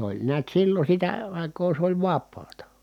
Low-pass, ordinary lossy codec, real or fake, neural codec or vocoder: 19.8 kHz; none; real; none